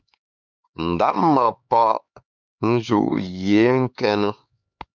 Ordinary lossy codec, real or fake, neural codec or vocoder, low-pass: MP3, 64 kbps; fake; codec, 16 kHz, 4 kbps, X-Codec, HuBERT features, trained on LibriSpeech; 7.2 kHz